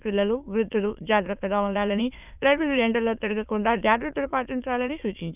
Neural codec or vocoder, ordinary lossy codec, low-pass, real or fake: autoencoder, 22.05 kHz, a latent of 192 numbers a frame, VITS, trained on many speakers; none; 3.6 kHz; fake